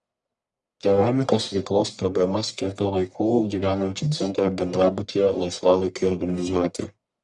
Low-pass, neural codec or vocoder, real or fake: 10.8 kHz; codec, 44.1 kHz, 1.7 kbps, Pupu-Codec; fake